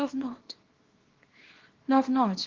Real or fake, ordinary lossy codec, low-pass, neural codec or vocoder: fake; Opus, 16 kbps; 7.2 kHz; codec, 24 kHz, 0.9 kbps, WavTokenizer, small release